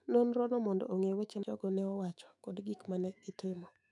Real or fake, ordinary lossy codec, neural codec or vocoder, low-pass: fake; none; autoencoder, 48 kHz, 128 numbers a frame, DAC-VAE, trained on Japanese speech; 10.8 kHz